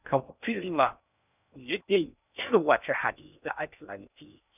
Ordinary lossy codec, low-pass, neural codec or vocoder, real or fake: none; 3.6 kHz; codec, 16 kHz in and 24 kHz out, 0.6 kbps, FocalCodec, streaming, 2048 codes; fake